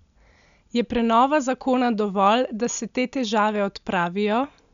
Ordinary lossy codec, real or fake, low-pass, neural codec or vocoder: none; real; 7.2 kHz; none